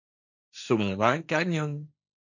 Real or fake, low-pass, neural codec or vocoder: fake; 7.2 kHz; codec, 16 kHz, 1.1 kbps, Voila-Tokenizer